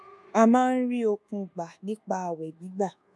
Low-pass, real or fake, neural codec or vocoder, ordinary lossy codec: none; fake; codec, 24 kHz, 1.2 kbps, DualCodec; none